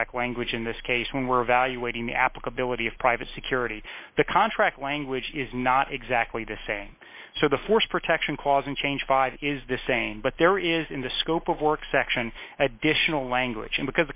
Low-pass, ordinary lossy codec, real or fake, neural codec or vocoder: 3.6 kHz; MP3, 32 kbps; real; none